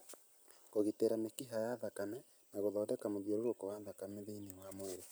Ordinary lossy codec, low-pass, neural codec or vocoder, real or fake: none; none; none; real